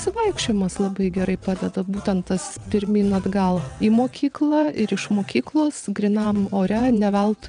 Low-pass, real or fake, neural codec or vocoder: 9.9 kHz; fake; vocoder, 22.05 kHz, 80 mel bands, WaveNeXt